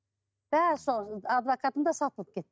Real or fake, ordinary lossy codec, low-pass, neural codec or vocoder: real; none; none; none